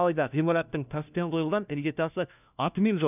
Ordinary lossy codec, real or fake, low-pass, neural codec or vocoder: none; fake; 3.6 kHz; codec, 16 kHz, 1 kbps, FunCodec, trained on LibriTTS, 50 frames a second